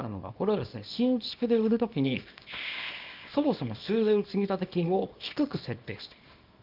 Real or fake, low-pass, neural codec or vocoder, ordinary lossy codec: fake; 5.4 kHz; codec, 24 kHz, 0.9 kbps, WavTokenizer, small release; Opus, 24 kbps